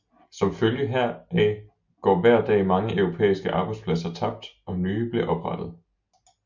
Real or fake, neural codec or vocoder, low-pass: real; none; 7.2 kHz